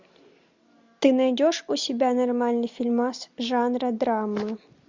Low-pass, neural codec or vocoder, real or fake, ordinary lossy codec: 7.2 kHz; none; real; MP3, 64 kbps